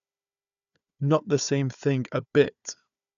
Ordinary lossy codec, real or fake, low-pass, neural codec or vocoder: none; fake; 7.2 kHz; codec, 16 kHz, 4 kbps, FunCodec, trained on Chinese and English, 50 frames a second